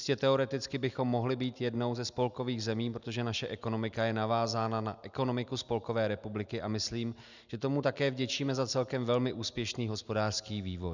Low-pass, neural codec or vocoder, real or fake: 7.2 kHz; none; real